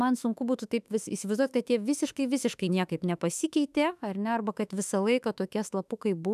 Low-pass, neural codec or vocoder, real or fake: 14.4 kHz; autoencoder, 48 kHz, 32 numbers a frame, DAC-VAE, trained on Japanese speech; fake